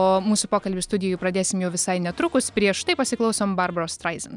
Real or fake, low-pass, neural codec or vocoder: real; 10.8 kHz; none